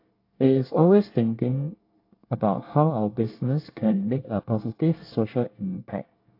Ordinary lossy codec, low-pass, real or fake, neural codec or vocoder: AAC, 32 kbps; 5.4 kHz; fake; codec, 24 kHz, 1 kbps, SNAC